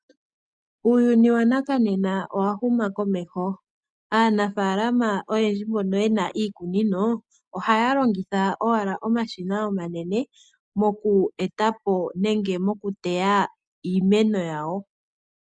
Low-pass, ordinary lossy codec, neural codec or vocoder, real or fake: 9.9 kHz; MP3, 96 kbps; none; real